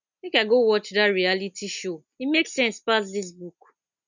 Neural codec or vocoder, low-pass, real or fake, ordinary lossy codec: none; 7.2 kHz; real; none